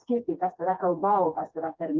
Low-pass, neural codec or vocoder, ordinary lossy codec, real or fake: 7.2 kHz; codec, 16 kHz, 2 kbps, FreqCodec, smaller model; Opus, 32 kbps; fake